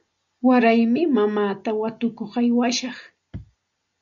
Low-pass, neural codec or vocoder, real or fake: 7.2 kHz; none; real